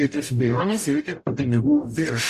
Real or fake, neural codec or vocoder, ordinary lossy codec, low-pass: fake; codec, 44.1 kHz, 0.9 kbps, DAC; Opus, 64 kbps; 14.4 kHz